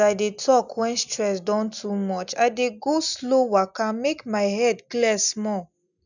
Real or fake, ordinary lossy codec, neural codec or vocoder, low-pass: real; none; none; 7.2 kHz